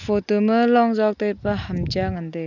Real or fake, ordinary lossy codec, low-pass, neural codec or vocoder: real; none; 7.2 kHz; none